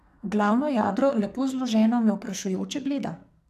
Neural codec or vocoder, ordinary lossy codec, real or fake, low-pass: codec, 44.1 kHz, 2.6 kbps, SNAC; none; fake; 14.4 kHz